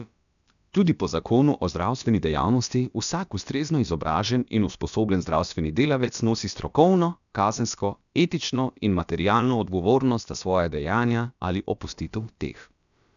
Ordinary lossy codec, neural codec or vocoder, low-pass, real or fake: none; codec, 16 kHz, about 1 kbps, DyCAST, with the encoder's durations; 7.2 kHz; fake